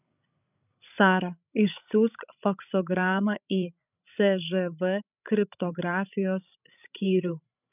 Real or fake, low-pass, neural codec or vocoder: fake; 3.6 kHz; codec, 16 kHz, 16 kbps, FreqCodec, larger model